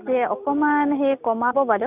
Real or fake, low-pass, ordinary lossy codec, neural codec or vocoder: real; 3.6 kHz; none; none